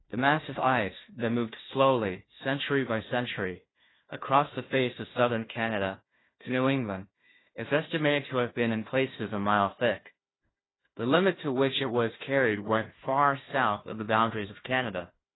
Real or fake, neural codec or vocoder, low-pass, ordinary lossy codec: fake; codec, 16 kHz, 1 kbps, FunCodec, trained on Chinese and English, 50 frames a second; 7.2 kHz; AAC, 16 kbps